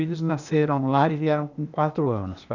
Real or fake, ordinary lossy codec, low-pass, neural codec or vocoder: fake; none; 7.2 kHz; codec, 16 kHz, 0.8 kbps, ZipCodec